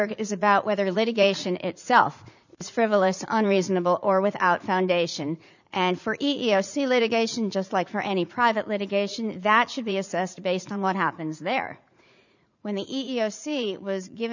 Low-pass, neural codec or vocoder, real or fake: 7.2 kHz; vocoder, 44.1 kHz, 80 mel bands, Vocos; fake